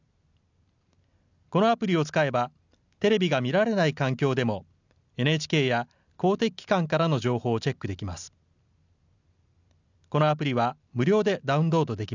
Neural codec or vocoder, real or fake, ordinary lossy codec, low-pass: none; real; none; 7.2 kHz